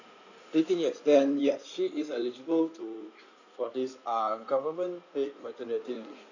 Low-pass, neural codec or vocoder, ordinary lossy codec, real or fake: 7.2 kHz; codec, 16 kHz in and 24 kHz out, 2.2 kbps, FireRedTTS-2 codec; none; fake